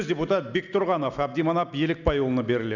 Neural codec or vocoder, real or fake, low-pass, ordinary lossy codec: none; real; 7.2 kHz; none